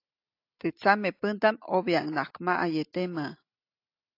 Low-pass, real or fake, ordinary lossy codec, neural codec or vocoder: 5.4 kHz; real; AAC, 32 kbps; none